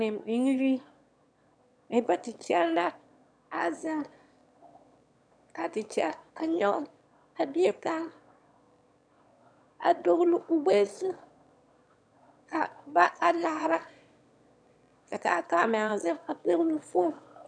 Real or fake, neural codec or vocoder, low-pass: fake; autoencoder, 22.05 kHz, a latent of 192 numbers a frame, VITS, trained on one speaker; 9.9 kHz